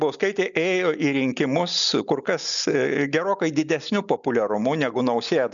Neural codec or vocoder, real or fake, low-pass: none; real; 7.2 kHz